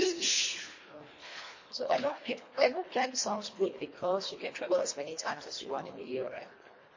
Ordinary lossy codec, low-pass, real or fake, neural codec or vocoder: MP3, 32 kbps; 7.2 kHz; fake; codec, 24 kHz, 1.5 kbps, HILCodec